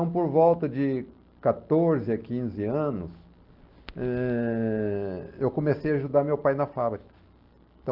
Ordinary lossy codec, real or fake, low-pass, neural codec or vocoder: Opus, 32 kbps; real; 5.4 kHz; none